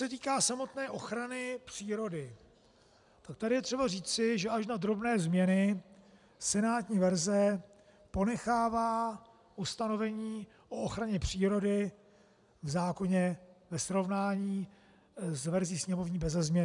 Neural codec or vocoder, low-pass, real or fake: none; 10.8 kHz; real